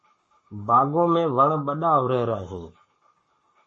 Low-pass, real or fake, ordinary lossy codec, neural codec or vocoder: 10.8 kHz; fake; MP3, 32 kbps; codec, 44.1 kHz, 7.8 kbps, Pupu-Codec